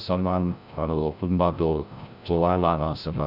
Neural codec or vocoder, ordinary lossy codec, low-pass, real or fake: codec, 16 kHz, 0.5 kbps, FreqCodec, larger model; none; 5.4 kHz; fake